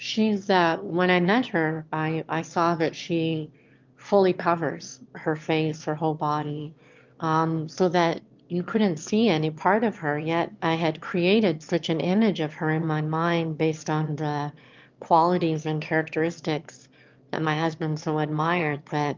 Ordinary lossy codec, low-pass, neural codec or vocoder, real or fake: Opus, 32 kbps; 7.2 kHz; autoencoder, 22.05 kHz, a latent of 192 numbers a frame, VITS, trained on one speaker; fake